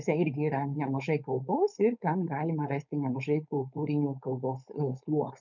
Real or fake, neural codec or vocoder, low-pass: fake; codec, 16 kHz, 4.8 kbps, FACodec; 7.2 kHz